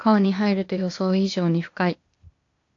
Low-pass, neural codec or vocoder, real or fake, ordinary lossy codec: 7.2 kHz; codec, 16 kHz, 0.8 kbps, ZipCodec; fake; AAC, 48 kbps